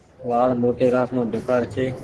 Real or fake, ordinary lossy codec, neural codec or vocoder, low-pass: fake; Opus, 16 kbps; codec, 44.1 kHz, 3.4 kbps, Pupu-Codec; 10.8 kHz